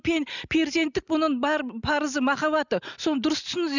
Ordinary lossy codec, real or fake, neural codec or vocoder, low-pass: none; real; none; 7.2 kHz